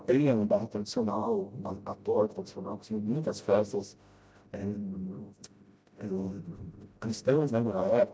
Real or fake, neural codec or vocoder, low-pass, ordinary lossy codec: fake; codec, 16 kHz, 0.5 kbps, FreqCodec, smaller model; none; none